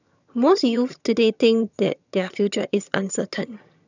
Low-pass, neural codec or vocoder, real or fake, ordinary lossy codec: 7.2 kHz; vocoder, 22.05 kHz, 80 mel bands, HiFi-GAN; fake; none